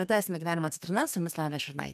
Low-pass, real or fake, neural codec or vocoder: 14.4 kHz; fake; codec, 32 kHz, 1.9 kbps, SNAC